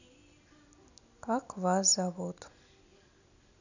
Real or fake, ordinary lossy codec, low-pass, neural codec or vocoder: real; none; 7.2 kHz; none